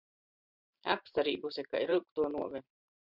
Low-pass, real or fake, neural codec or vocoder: 5.4 kHz; fake; vocoder, 44.1 kHz, 128 mel bands every 512 samples, BigVGAN v2